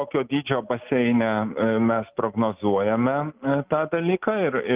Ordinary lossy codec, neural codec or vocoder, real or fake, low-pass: Opus, 32 kbps; none; real; 3.6 kHz